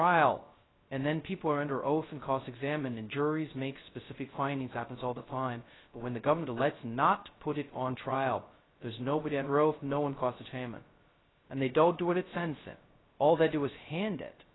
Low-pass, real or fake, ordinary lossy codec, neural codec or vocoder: 7.2 kHz; fake; AAC, 16 kbps; codec, 16 kHz, 0.2 kbps, FocalCodec